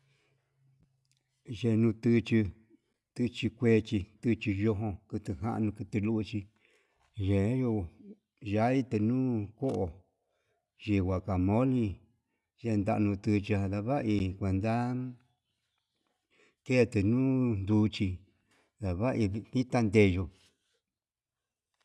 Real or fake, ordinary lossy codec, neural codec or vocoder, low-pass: real; none; none; none